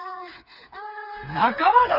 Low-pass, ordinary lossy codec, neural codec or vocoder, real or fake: 5.4 kHz; Opus, 64 kbps; codec, 16 kHz, 4 kbps, FreqCodec, smaller model; fake